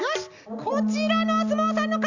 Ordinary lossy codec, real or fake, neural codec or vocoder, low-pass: Opus, 64 kbps; real; none; 7.2 kHz